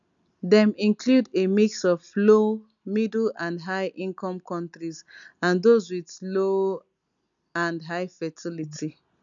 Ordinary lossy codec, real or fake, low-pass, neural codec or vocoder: none; real; 7.2 kHz; none